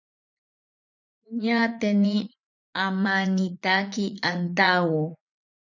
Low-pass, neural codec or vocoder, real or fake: 7.2 kHz; vocoder, 44.1 kHz, 80 mel bands, Vocos; fake